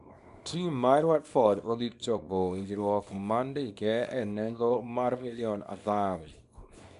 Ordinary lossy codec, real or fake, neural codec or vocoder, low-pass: none; fake; codec, 24 kHz, 0.9 kbps, WavTokenizer, small release; 10.8 kHz